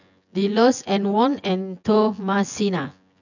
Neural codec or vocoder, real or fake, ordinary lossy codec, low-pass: vocoder, 24 kHz, 100 mel bands, Vocos; fake; none; 7.2 kHz